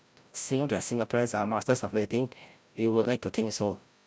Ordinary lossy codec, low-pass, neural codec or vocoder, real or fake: none; none; codec, 16 kHz, 0.5 kbps, FreqCodec, larger model; fake